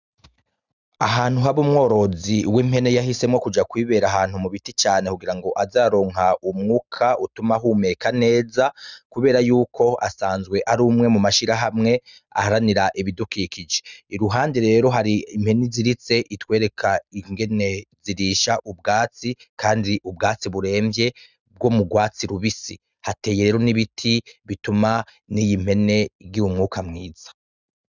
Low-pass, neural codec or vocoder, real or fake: 7.2 kHz; none; real